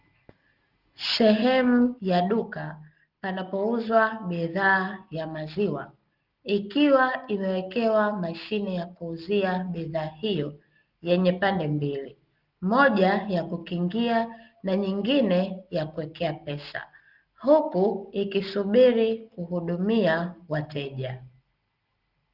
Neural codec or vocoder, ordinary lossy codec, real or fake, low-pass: none; Opus, 24 kbps; real; 5.4 kHz